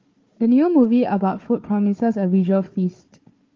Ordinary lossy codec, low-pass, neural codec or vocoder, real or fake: Opus, 32 kbps; 7.2 kHz; codec, 16 kHz, 4 kbps, FunCodec, trained on Chinese and English, 50 frames a second; fake